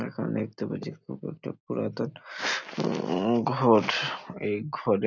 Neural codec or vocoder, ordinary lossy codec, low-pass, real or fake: none; none; 7.2 kHz; real